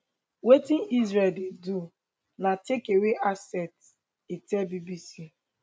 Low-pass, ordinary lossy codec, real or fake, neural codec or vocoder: none; none; real; none